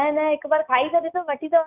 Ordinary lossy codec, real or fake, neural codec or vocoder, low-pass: none; real; none; 3.6 kHz